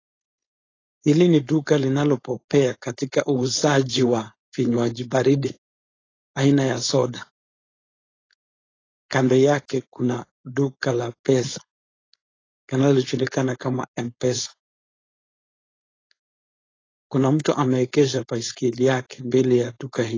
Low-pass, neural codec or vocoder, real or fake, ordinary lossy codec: 7.2 kHz; codec, 16 kHz, 4.8 kbps, FACodec; fake; AAC, 32 kbps